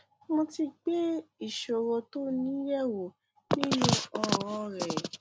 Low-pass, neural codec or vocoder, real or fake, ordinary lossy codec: none; none; real; none